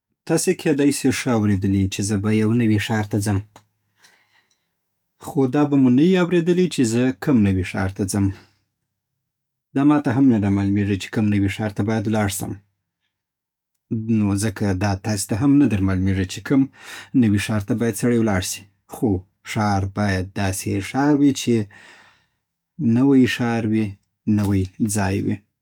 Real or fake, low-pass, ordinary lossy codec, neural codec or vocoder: fake; 19.8 kHz; none; codec, 44.1 kHz, 7.8 kbps, DAC